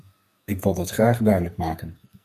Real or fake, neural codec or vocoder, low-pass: fake; codec, 44.1 kHz, 2.6 kbps, SNAC; 14.4 kHz